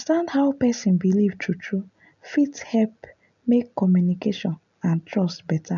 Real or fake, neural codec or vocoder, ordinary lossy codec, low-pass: real; none; none; 7.2 kHz